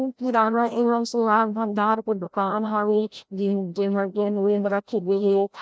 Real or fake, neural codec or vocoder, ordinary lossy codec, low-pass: fake; codec, 16 kHz, 0.5 kbps, FreqCodec, larger model; none; none